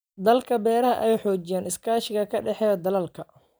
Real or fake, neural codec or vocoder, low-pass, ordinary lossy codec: real; none; none; none